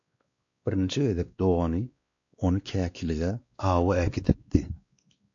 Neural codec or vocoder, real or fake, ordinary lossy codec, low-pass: codec, 16 kHz, 2 kbps, X-Codec, WavLM features, trained on Multilingual LibriSpeech; fake; AAC, 48 kbps; 7.2 kHz